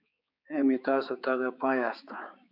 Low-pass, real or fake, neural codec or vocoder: 5.4 kHz; fake; codec, 16 kHz, 4 kbps, X-Codec, HuBERT features, trained on balanced general audio